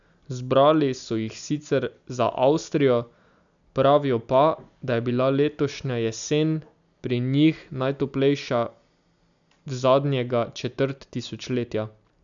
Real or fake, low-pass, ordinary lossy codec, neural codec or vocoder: real; 7.2 kHz; none; none